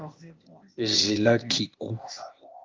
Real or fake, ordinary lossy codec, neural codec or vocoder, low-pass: fake; Opus, 32 kbps; codec, 16 kHz, 0.8 kbps, ZipCodec; 7.2 kHz